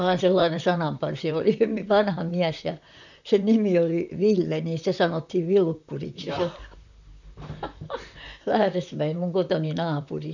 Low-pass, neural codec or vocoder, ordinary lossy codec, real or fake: 7.2 kHz; vocoder, 44.1 kHz, 128 mel bands, Pupu-Vocoder; none; fake